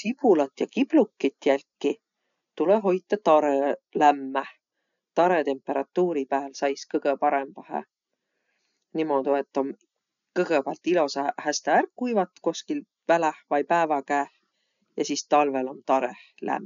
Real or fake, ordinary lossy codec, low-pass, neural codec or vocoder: real; none; 7.2 kHz; none